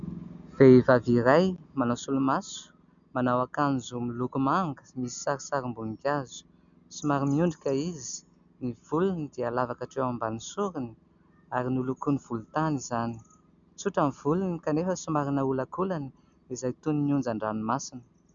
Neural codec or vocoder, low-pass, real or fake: none; 7.2 kHz; real